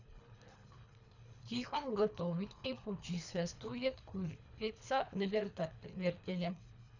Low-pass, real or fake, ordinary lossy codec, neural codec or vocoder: 7.2 kHz; fake; none; codec, 24 kHz, 3 kbps, HILCodec